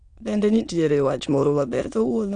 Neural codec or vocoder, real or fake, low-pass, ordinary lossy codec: autoencoder, 22.05 kHz, a latent of 192 numbers a frame, VITS, trained on many speakers; fake; 9.9 kHz; none